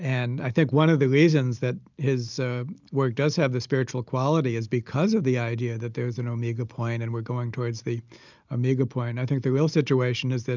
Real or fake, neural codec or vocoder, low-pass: real; none; 7.2 kHz